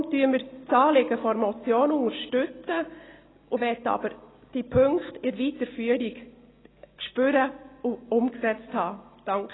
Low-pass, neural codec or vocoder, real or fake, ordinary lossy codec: 7.2 kHz; none; real; AAC, 16 kbps